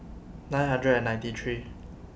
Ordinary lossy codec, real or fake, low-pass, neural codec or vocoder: none; real; none; none